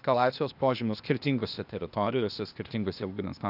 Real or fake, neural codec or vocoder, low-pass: fake; codec, 16 kHz, 0.8 kbps, ZipCodec; 5.4 kHz